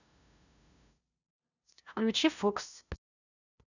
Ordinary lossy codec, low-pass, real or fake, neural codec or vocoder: none; 7.2 kHz; fake; codec, 16 kHz, 0.5 kbps, FunCodec, trained on LibriTTS, 25 frames a second